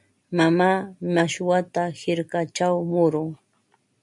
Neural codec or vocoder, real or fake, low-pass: none; real; 10.8 kHz